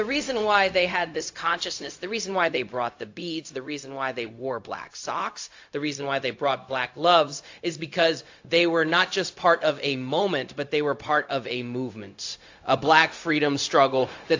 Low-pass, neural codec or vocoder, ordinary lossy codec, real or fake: 7.2 kHz; codec, 16 kHz, 0.4 kbps, LongCat-Audio-Codec; AAC, 48 kbps; fake